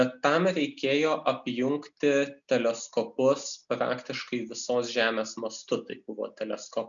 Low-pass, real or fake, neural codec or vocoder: 7.2 kHz; real; none